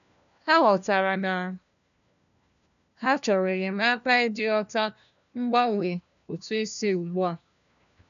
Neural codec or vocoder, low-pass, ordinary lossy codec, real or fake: codec, 16 kHz, 1 kbps, FunCodec, trained on LibriTTS, 50 frames a second; 7.2 kHz; none; fake